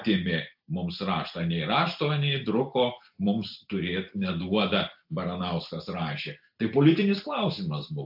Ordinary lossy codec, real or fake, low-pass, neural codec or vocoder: AAC, 48 kbps; real; 5.4 kHz; none